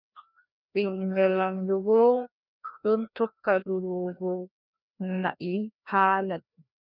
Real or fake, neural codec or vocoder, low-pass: fake; codec, 16 kHz, 1 kbps, FreqCodec, larger model; 5.4 kHz